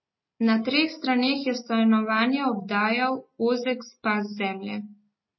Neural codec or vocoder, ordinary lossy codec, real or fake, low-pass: none; MP3, 24 kbps; real; 7.2 kHz